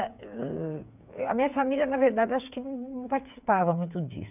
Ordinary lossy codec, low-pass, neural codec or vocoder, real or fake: none; 3.6 kHz; codec, 16 kHz, 8 kbps, FreqCodec, smaller model; fake